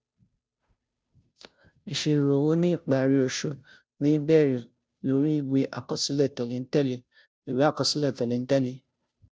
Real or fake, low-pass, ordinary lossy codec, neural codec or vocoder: fake; none; none; codec, 16 kHz, 0.5 kbps, FunCodec, trained on Chinese and English, 25 frames a second